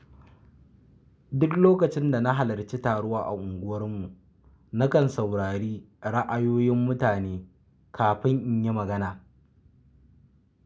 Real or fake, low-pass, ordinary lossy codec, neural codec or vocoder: real; none; none; none